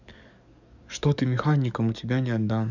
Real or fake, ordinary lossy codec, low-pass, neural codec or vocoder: fake; none; 7.2 kHz; codec, 44.1 kHz, 7.8 kbps, DAC